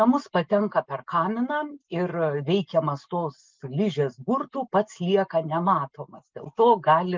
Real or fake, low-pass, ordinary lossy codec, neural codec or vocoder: real; 7.2 kHz; Opus, 32 kbps; none